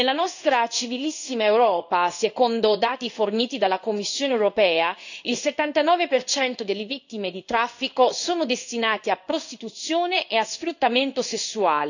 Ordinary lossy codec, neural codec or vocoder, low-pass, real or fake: none; codec, 16 kHz in and 24 kHz out, 1 kbps, XY-Tokenizer; 7.2 kHz; fake